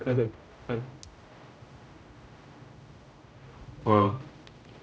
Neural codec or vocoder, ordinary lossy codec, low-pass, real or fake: codec, 16 kHz, 0.5 kbps, X-Codec, HuBERT features, trained on general audio; none; none; fake